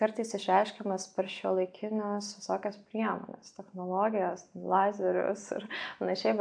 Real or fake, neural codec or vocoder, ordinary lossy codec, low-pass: real; none; AAC, 64 kbps; 9.9 kHz